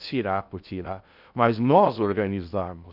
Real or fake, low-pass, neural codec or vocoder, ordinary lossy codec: fake; 5.4 kHz; codec, 16 kHz in and 24 kHz out, 0.6 kbps, FocalCodec, streaming, 2048 codes; none